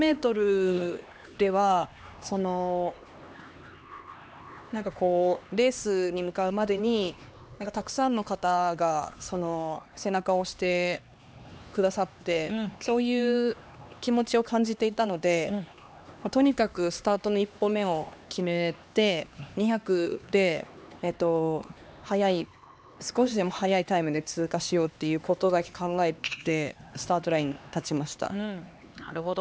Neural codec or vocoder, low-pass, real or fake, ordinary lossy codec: codec, 16 kHz, 2 kbps, X-Codec, HuBERT features, trained on LibriSpeech; none; fake; none